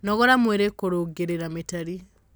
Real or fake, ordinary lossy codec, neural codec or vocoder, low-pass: real; none; none; none